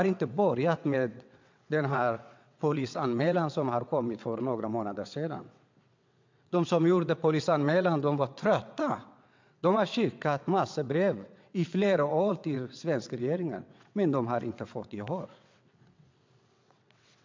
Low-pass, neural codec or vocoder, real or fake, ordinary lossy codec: 7.2 kHz; vocoder, 22.05 kHz, 80 mel bands, WaveNeXt; fake; MP3, 64 kbps